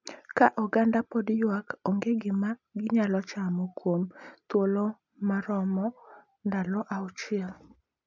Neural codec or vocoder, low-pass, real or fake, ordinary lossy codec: none; 7.2 kHz; real; none